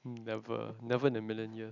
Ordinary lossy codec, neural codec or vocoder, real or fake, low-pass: none; none; real; 7.2 kHz